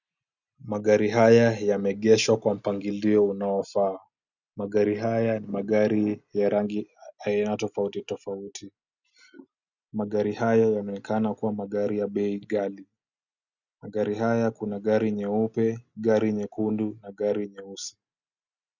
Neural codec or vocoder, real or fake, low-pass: none; real; 7.2 kHz